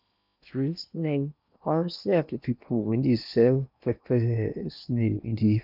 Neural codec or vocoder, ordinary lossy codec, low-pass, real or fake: codec, 16 kHz in and 24 kHz out, 0.8 kbps, FocalCodec, streaming, 65536 codes; none; 5.4 kHz; fake